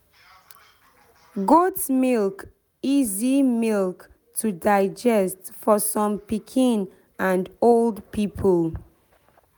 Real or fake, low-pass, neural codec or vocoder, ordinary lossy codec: real; none; none; none